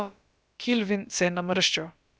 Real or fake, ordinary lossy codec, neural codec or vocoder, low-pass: fake; none; codec, 16 kHz, about 1 kbps, DyCAST, with the encoder's durations; none